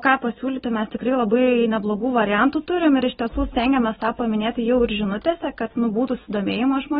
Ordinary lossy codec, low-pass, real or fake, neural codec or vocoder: AAC, 16 kbps; 7.2 kHz; real; none